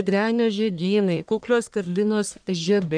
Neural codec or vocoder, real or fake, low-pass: codec, 44.1 kHz, 1.7 kbps, Pupu-Codec; fake; 9.9 kHz